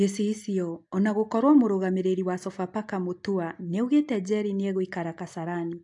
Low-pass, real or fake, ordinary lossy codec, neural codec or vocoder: 10.8 kHz; real; AAC, 64 kbps; none